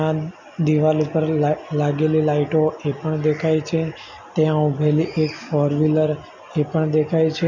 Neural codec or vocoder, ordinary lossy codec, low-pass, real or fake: none; none; 7.2 kHz; real